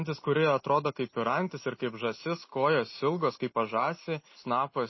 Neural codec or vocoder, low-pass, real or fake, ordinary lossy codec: none; 7.2 kHz; real; MP3, 24 kbps